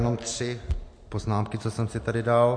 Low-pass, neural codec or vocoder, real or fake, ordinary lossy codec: 9.9 kHz; none; real; MP3, 48 kbps